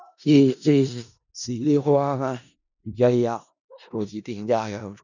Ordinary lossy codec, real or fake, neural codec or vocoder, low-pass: none; fake; codec, 16 kHz in and 24 kHz out, 0.4 kbps, LongCat-Audio-Codec, four codebook decoder; 7.2 kHz